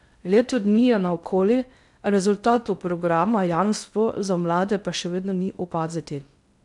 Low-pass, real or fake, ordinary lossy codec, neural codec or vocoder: 10.8 kHz; fake; none; codec, 16 kHz in and 24 kHz out, 0.6 kbps, FocalCodec, streaming, 2048 codes